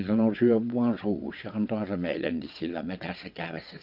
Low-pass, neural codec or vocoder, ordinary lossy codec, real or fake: 5.4 kHz; vocoder, 22.05 kHz, 80 mel bands, WaveNeXt; none; fake